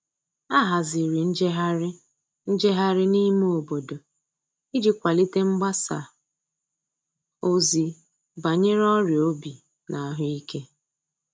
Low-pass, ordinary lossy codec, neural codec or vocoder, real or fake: none; none; none; real